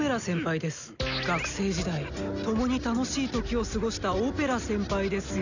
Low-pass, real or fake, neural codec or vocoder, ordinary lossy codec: 7.2 kHz; real; none; none